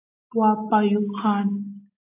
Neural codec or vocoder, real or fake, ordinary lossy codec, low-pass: none; real; AAC, 24 kbps; 3.6 kHz